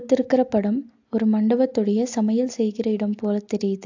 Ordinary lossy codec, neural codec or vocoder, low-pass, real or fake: AAC, 48 kbps; none; 7.2 kHz; real